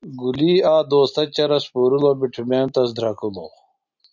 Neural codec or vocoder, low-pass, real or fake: none; 7.2 kHz; real